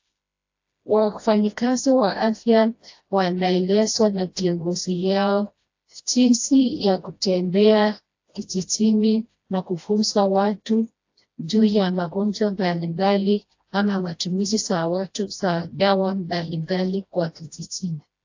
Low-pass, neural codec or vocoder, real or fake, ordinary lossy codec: 7.2 kHz; codec, 16 kHz, 1 kbps, FreqCodec, smaller model; fake; AAC, 48 kbps